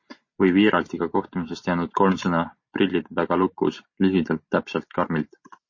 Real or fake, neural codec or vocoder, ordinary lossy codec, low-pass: real; none; MP3, 32 kbps; 7.2 kHz